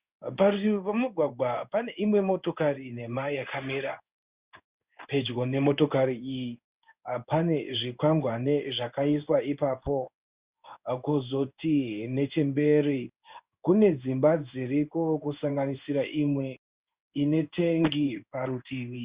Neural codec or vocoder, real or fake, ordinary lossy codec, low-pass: codec, 16 kHz in and 24 kHz out, 1 kbps, XY-Tokenizer; fake; Opus, 64 kbps; 3.6 kHz